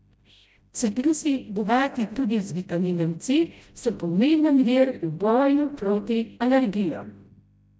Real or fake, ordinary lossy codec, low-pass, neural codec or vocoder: fake; none; none; codec, 16 kHz, 0.5 kbps, FreqCodec, smaller model